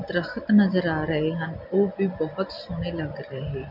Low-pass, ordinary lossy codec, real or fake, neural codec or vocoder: 5.4 kHz; MP3, 48 kbps; real; none